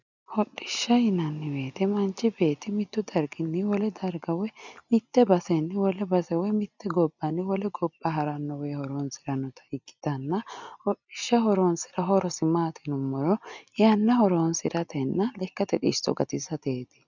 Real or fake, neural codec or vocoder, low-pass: real; none; 7.2 kHz